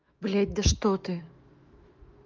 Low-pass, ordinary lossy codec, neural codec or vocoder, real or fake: 7.2 kHz; Opus, 32 kbps; autoencoder, 48 kHz, 128 numbers a frame, DAC-VAE, trained on Japanese speech; fake